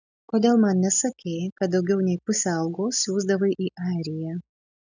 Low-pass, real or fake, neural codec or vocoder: 7.2 kHz; real; none